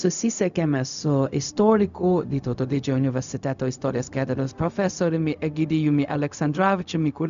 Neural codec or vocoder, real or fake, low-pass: codec, 16 kHz, 0.4 kbps, LongCat-Audio-Codec; fake; 7.2 kHz